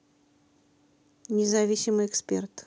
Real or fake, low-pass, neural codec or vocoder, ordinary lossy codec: real; none; none; none